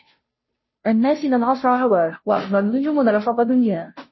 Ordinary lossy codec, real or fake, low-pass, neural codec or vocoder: MP3, 24 kbps; fake; 7.2 kHz; codec, 16 kHz, 0.5 kbps, FunCodec, trained on Chinese and English, 25 frames a second